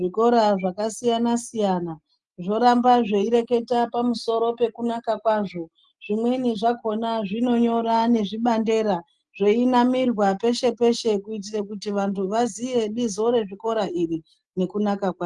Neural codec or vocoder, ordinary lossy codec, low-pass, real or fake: none; Opus, 24 kbps; 10.8 kHz; real